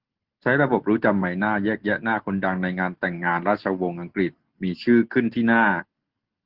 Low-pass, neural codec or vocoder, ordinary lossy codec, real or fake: 5.4 kHz; none; Opus, 16 kbps; real